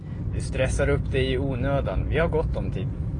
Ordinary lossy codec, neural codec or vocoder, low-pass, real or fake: AAC, 32 kbps; none; 9.9 kHz; real